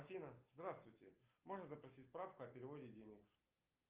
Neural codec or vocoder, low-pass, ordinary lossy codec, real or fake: none; 3.6 kHz; Opus, 24 kbps; real